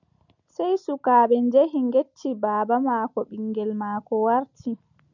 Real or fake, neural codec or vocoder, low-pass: real; none; 7.2 kHz